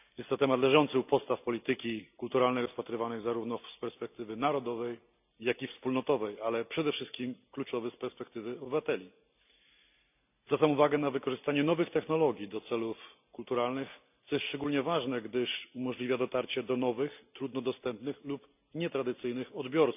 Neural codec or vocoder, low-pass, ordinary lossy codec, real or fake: none; 3.6 kHz; none; real